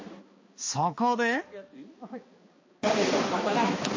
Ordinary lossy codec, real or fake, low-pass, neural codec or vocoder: MP3, 32 kbps; fake; 7.2 kHz; codec, 16 kHz, 2 kbps, X-Codec, HuBERT features, trained on balanced general audio